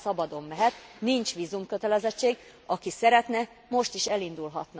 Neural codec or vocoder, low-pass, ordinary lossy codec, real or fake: none; none; none; real